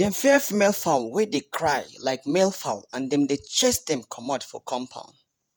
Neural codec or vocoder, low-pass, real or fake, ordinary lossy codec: vocoder, 48 kHz, 128 mel bands, Vocos; none; fake; none